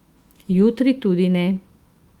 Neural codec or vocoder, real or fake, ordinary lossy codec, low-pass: autoencoder, 48 kHz, 128 numbers a frame, DAC-VAE, trained on Japanese speech; fake; Opus, 32 kbps; 19.8 kHz